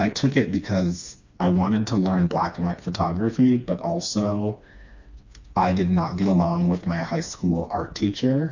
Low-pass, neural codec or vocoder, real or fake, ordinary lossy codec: 7.2 kHz; codec, 16 kHz, 2 kbps, FreqCodec, smaller model; fake; MP3, 64 kbps